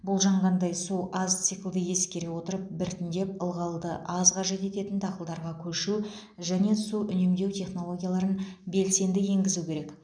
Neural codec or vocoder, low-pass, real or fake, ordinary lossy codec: none; 9.9 kHz; real; none